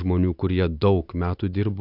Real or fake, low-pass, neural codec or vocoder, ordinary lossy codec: real; 5.4 kHz; none; AAC, 48 kbps